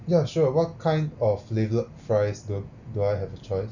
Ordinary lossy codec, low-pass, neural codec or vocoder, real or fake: none; 7.2 kHz; none; real